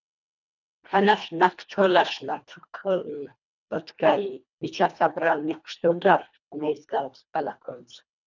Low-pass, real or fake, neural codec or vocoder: 7.2 kHz; fake; codec, 24 kHz, 1.5 kbps, HILCodec